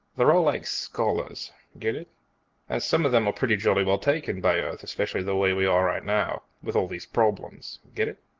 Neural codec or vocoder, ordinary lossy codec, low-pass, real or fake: none; Opus, 16 kbps; 7.2 kHz; real